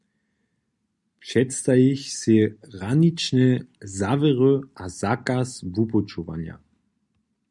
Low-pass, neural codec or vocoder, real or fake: 10.8 kHz; none; real